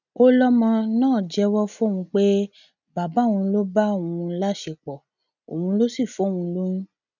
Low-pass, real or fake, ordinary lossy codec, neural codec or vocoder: 7.2 kHz; real; none; none